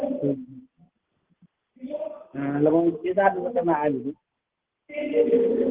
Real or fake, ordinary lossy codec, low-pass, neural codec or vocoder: real; Opus, 16 kbps; 3.6 kHz; none